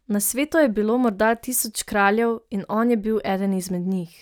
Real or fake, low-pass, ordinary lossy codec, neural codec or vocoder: real; none; none; none